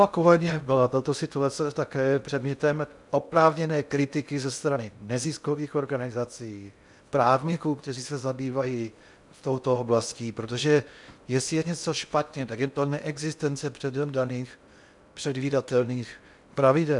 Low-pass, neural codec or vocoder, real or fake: 10.8 kHz; codec, 16 kHz in and 24 kHz out, 0.6 kbps, FocalCodec, streaming, 2048 codes; fake